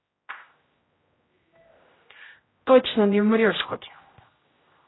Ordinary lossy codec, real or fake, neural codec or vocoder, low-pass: AAC, 16 kbps; fake; codec, 16 kHz, 0.5 kbps, X-Codec, HuBERT features, trained on general audio; 7.2 kHz